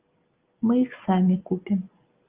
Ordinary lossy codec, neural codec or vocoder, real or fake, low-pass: Opus, 32 kbps; none; real; 3.6 kHz